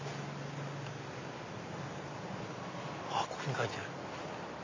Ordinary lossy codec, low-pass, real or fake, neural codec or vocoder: AAC, 32 kbps; 7.2 kHz; real; none